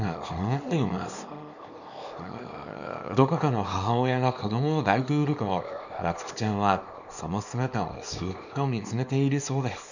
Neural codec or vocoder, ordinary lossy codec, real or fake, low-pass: codec, 24 kHz, 0.9 kbps, WavTokenizer, small release; none; fake; 7.2 kHz